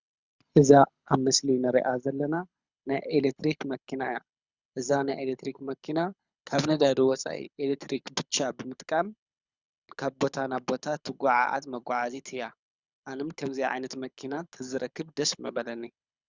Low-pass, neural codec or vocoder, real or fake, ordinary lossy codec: 7.2 kHz; codec, 24 kHz, 6 kbps, HILCodec; fake; Opus, 64 kbps